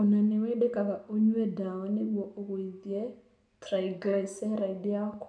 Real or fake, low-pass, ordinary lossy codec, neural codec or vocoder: real; 9.9 kHz; none; none